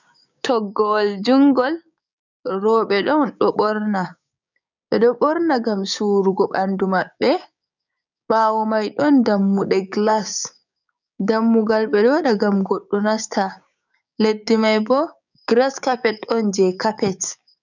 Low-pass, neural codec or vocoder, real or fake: 7.2 kHz; codec, 16 kHz, 6 kbps, DAC; fake